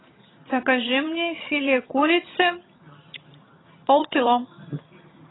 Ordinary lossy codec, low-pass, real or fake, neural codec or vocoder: AAC, 16 kbps; 7.2 kHz; fake; vocoder, 22.05 kHz, 80 mel bands, HiFi-GAN